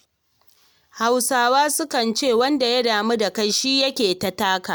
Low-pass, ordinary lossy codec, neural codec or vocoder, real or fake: none; none; none; real